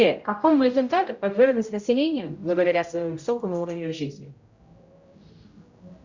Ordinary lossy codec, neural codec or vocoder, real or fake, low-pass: Opus, 64 kbps; codec, 16 kHz, 0.5 kbps, X-Codec, HuBERT features, trained on general audio; fake; 7.2 kHz